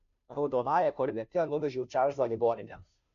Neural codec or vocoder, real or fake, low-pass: codec, 16 kHz, 0.5 kbps, FunCodec, trained on Chinese and English, 25 frames a second; fake; 7.2 kHz